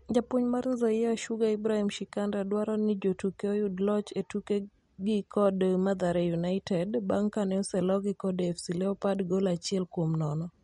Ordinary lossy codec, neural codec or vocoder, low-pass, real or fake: MP3, 48 kbps; none; 19.8 kHz; real